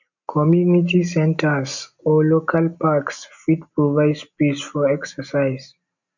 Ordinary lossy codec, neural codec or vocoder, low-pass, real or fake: none; none; 7.2 kHz; real